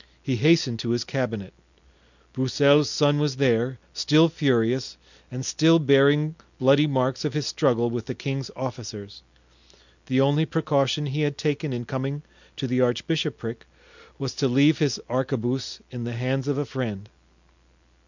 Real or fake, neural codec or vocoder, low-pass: real; none; 7.2 kHz